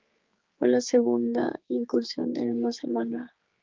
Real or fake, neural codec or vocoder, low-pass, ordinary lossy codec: fake; codec, 16 kHz, 4 kbps, X-Codec, HuBERT features, trained on general audio; 7.2 kHz; Opus, 32 kbps